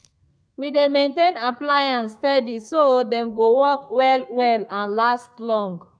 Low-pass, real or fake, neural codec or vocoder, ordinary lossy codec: 9.9 kHz; fake; codec, 32 kHz, 1.9 kbps, SNAC; none